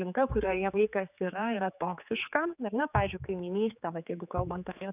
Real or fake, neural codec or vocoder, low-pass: fake; codec, 16 kHz, 4 kbps, X-Codec, HuBERT features, trained on general audio; 3.6 kHz